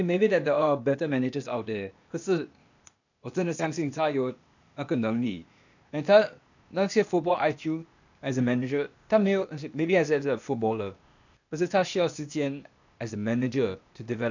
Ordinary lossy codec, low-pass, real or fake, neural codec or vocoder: none; 7.2 kHz; fake; codec, 16 kHz, 0.8 kbps, ZipCodec